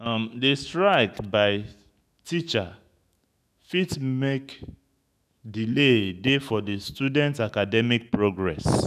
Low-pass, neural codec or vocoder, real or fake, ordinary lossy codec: 14.4 kHz; autoencoder, 48 kHz, 128 numbers a frame, DAC-VAE, trained on Japanese speech; fake; none